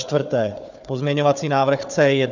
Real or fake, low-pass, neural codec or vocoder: fake; 7.2 kHz; codec, 16 kHz, 4 kbps, FunCodec, trained on Chinese and English, 50 frames a second